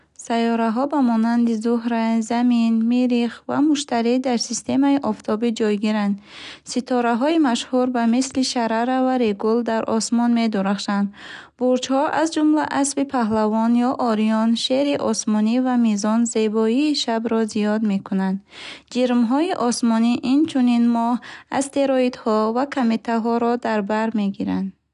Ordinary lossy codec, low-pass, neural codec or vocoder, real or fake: none; 10.8 kHz; none; real